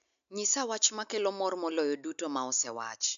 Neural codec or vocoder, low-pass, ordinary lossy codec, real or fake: none; 7.2 kHz; MP3, 64 kbps; real